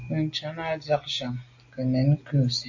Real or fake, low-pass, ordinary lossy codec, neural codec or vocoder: real; 7.2 kHz; AAC, 48 kbps; none